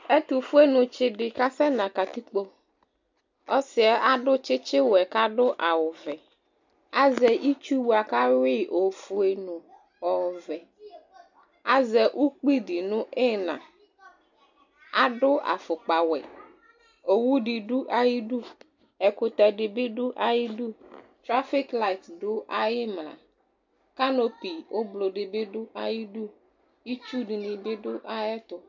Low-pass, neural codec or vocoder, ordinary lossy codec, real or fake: 7.2 kHz; none; MP3, 64 kbps; real